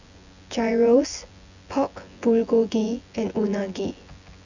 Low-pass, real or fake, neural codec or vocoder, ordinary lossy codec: 7.2 kHz; fake; vocoder, 24 kHz, 100 mel bands, Vocos; none